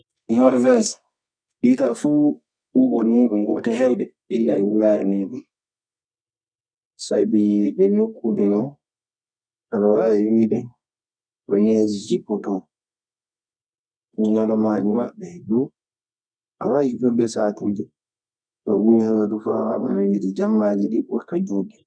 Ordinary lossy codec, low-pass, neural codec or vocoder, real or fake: none; 9.9 kHz; codec, 24 kHz, 0.9 kbps, WavTokenizer, medium music audio release; fake